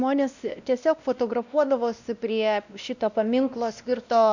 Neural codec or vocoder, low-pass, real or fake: codec, 16 kHz, 1 kbps, X-Codec, WavLM features, trained on Multilingual LibriSpeech; 7.2 kHz; fake